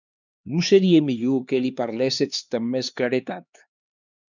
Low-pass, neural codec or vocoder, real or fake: 7.2 kHz; codec, 16 kHz, 2 kbps, X-Codec, HuBERT features, trained on LibriSpeech; fake